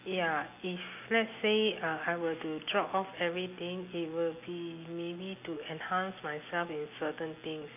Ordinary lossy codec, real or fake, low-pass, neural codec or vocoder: AAC, 32 kbps; real; 3.6 kHz; none